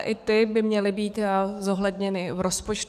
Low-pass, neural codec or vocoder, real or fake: 14.4 kHz; codec, 44.1 kHz, 7.8 kbps, DAC; fake